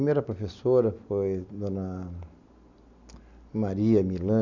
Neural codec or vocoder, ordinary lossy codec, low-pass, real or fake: none; none; 7.2 kHz; real